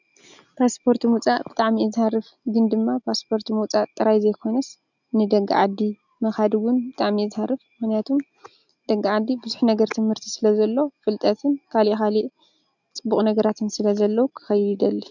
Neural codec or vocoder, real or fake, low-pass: none; real; 7.2 kHz